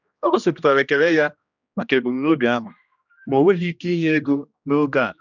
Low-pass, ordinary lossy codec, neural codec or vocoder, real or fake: 7.2 kHz; none; codec, 16 kHz, 1 kbps, X-Codec, HuBERT features, trained on general audio; fake